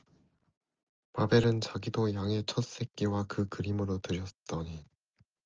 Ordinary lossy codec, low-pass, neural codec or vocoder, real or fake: Opus, 24 kbps; 7.2 kHz; none; real